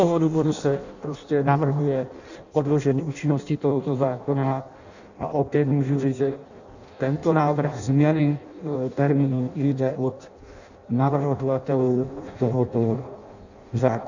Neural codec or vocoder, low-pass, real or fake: codec, 16 kHz in and 24 kHz out, 0.6 kbps, FireRedTTS-2 codec; 7.2 kHz; fake